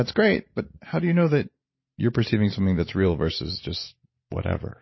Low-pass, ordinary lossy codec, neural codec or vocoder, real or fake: 7.2 kHz; MP3, 24 kbps; vocoder, 44.1 kHz, 128 mel bands every 512 samples, BigVGAN v2; fake